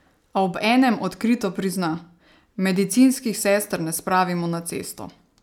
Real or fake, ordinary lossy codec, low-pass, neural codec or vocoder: real; none; 19.8 kHz; none